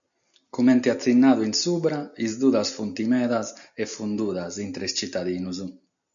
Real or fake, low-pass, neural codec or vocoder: real; 7.2 kHz; none